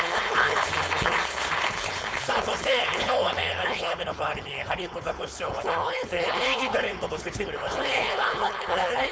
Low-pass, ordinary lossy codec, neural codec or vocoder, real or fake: none; none; codec, 16 kHz, 4.8 kbps, FACodec; fake